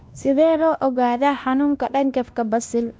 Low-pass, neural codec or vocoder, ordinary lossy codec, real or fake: none; codec, 16 kHz, 1 kbps, X-Codec, WavLM features, trained on Multilingual LibriSpeech; none; fake